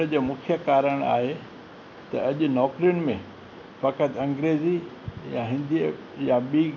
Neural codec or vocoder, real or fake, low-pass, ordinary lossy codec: none; real; 7.2 kHz; none